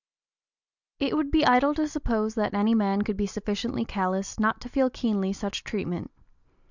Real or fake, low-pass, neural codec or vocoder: real; 7.2 kHz; none